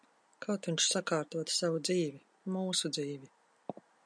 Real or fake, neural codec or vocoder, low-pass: real; none; 9.9 kHz